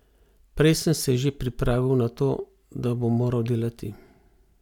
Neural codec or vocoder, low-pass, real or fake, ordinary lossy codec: none; 19.8 kHz; real; none